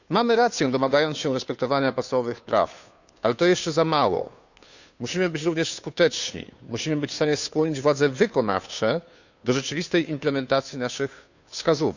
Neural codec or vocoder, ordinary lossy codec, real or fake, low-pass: codec, 16 kHz, 2 kbps, FunCodec, trained on Chinese and English, 25 frames a second; none; fake; 7.2 kHz